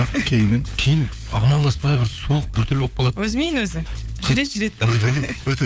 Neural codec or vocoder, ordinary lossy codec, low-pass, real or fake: codec, 16 kHz, 4 kbps, FunCodec, trained on LibriTTS, 50 frames a second; none; none; fake